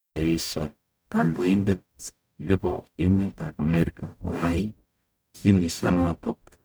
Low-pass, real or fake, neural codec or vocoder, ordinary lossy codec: none; fake; codec, 44.1 kHz, 0.9 kbps, DAC; none